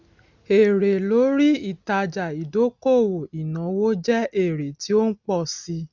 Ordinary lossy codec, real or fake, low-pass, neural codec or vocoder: none; real; 7.2 kHz; none